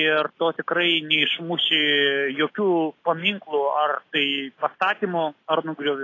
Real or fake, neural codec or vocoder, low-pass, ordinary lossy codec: real; none; 7.2 kHz; AAC, 32 kbps